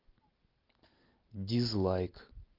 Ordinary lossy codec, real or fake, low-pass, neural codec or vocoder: Opus, 32 kbps; real; 5.4 kHz; none